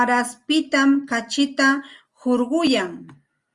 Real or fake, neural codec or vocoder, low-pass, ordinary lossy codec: real; none; 10.8 kHz; Opus, 32 kbps